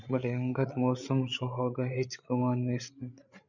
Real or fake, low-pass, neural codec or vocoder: fake; 7.2 kHz; codec, 16 kHz, 8 kbps, FreqCodec, larger model